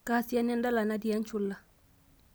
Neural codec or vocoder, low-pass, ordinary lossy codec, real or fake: none; none; none; real